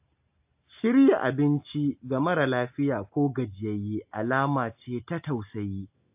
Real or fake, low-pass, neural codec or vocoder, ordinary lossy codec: real; 3.6 kHz; none; AAC, 32 kbps